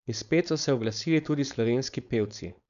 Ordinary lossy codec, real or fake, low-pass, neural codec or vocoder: none; fake; 7.2 kHz; codec, 16 kHz, 4.8 kbps, FACodec